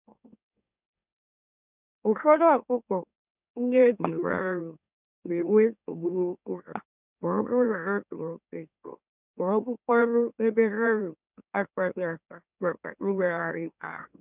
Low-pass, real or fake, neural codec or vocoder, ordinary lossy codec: 3.6 kHz; fake; autoencoder, 44.1 kHz, a latent of 192 numbers a frame, MeloTTS; none